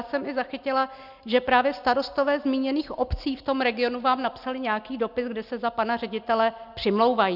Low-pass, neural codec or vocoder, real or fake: 5.4 kHz; none; real